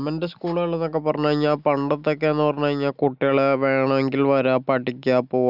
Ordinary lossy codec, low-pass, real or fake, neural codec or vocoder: none; 5.4 kHz; real; none